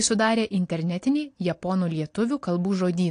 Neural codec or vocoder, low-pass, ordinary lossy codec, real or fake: none; 9.9 kHz; AAC, 48 kbps; real